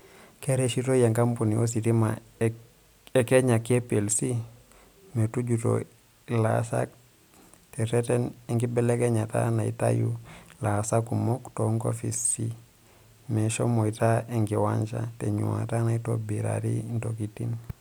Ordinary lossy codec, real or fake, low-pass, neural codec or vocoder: none; real; none; none